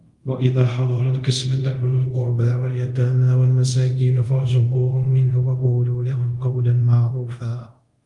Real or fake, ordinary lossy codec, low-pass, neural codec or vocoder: fake; Opus, 32 kbps; 10.8 kHz; codec, 24 kHz, 0.5 kbps, DualCodec